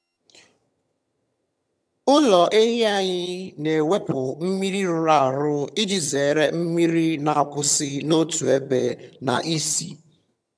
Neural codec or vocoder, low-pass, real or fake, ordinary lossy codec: vocoder, 22.05 kHz, 80 mel bands, HiFi-GAN; none; fake; none